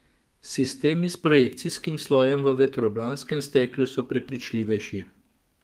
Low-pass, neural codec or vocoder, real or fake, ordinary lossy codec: 14.4 kHz; codec, 32 kHz, 1.9 kbps, SNAC; fake; Opus, 32 kbps